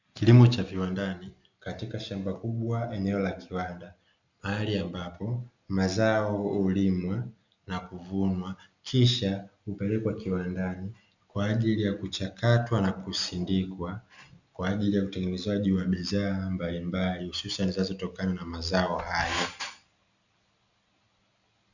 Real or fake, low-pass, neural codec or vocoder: real; 7.2 kHz; none